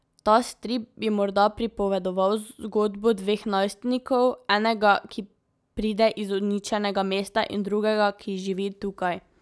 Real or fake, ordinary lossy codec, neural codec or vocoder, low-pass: real; none; none; none